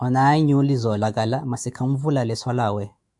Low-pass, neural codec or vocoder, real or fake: 10.8 kHz; autoencoder, 48 kHz, 128 numbers a frame, DAC-VAE, trained on Japanese speech; fake